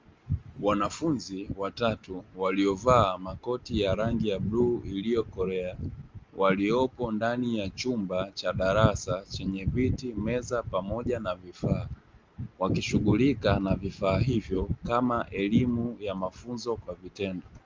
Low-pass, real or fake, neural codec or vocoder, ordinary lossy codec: 7.2 kHz; real; none; Opus, 32 kbps